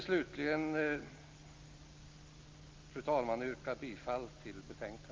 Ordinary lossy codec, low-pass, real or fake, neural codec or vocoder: Opus, 24 kbps; 7.2 kHz; real; none